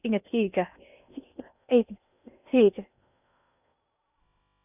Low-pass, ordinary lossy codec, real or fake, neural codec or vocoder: 3.6 kHz; none; fake; codec, 16 kHz in and 24 kHz out, 0.6 kbps, FocalCodec, streaming, 2048 codes